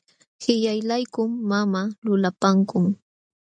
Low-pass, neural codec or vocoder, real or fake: 9.9 kHz; none; real